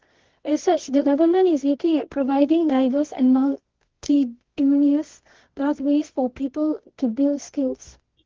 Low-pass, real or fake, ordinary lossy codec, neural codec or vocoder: 7.2 kHz; fake; Opus, 16 kbps; codec, 24 kHz, 0.9 kbps, WavTokenizer, medium music audio release